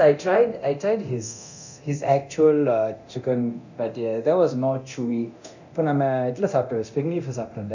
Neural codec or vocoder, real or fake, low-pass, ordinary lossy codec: codec, 24 kHz, 0.9 kbps, DualCodec; fake; 7.2 kHz; none